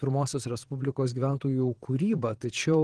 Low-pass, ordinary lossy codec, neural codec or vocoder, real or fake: 9.9 kHz; Opus, 16 kbps; vocoder, 22.05 kHz, 80 mel bands, Vocos; fake